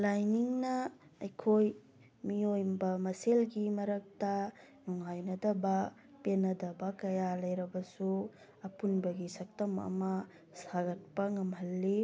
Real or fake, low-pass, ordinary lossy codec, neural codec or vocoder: real; none; none; none